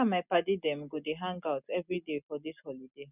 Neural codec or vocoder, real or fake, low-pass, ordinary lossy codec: none; real; 3.6 kHz; none